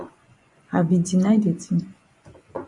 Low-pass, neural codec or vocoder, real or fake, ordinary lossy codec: 10.8 kHz; vocoder, 24 kHz, 100 mel bands, Vocos; fake; MP3, 96 kbps